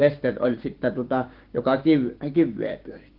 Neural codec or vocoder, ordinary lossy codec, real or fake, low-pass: codec, 16 kHz, 6 kbps, DAC; Opus, 16 kbps; fake; 5.4 kHz